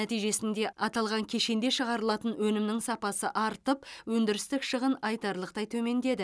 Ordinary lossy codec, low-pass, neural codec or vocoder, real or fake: none; none; none; real